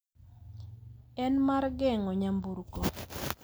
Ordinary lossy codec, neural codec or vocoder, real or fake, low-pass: none; none; real; none